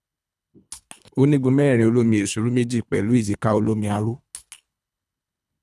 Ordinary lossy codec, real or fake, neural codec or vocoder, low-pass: none; fake; codec, 24 kHz, 3 kbps, HILCodec; none